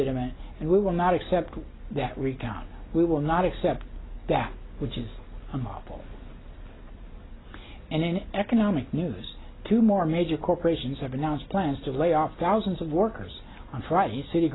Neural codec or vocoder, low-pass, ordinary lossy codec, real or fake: none; 7.2 kHz; AAC, 16 kbps; real